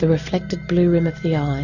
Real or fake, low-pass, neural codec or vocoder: real; 7.2 kHz; none